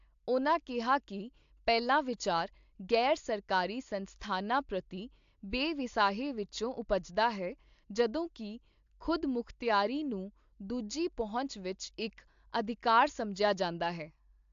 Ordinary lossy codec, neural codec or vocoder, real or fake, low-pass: AAC, 64 kbps; none; real; 7.2 kHz